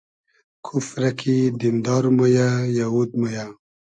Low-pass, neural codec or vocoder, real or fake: 9.9 kHz; none; real